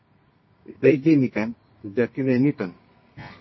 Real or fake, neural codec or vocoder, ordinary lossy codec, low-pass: fake; codec, 24 kHz, 0.9 kbps, WavTokenizer, medium music audio release; MP3, 24 kbps; 7.2 kHz